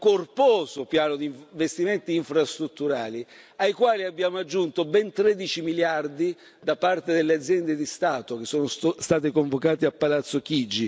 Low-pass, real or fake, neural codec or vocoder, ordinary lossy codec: none; real; none; none